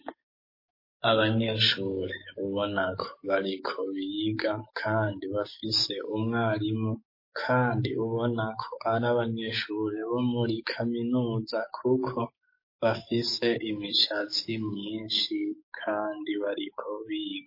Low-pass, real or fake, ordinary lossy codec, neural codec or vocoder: 5.4 kHz; fake; MP3, 24 kbps; codec, 44.1 kHz, 7.8 kbps, DAC